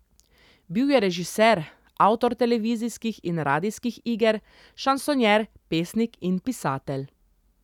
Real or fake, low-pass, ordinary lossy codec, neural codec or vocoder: real; 19.8 kHz; none; none